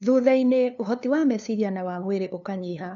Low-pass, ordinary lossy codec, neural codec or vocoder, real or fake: 7.2 kHz; none; codec, 16 kHz, 2 kbps, FunCodec, trained on LibriTTS, 25 frames a second; fake